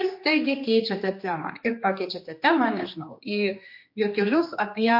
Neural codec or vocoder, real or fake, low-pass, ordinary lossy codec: codec, 16 kHz, 2 kbps, X-Codec, HuBERT features, trained on general audio; fake; 5.4 kHz; MP3, 32 kbps